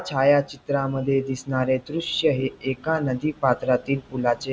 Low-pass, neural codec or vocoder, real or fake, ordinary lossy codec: none; none; real; none